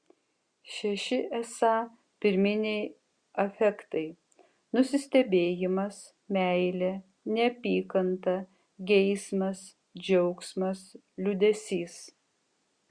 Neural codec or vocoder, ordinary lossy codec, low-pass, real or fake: none; Opus, 64 kbps; 9.9 kHz; real